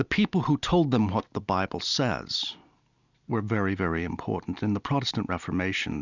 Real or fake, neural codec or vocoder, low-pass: real; none; 7.2 kHz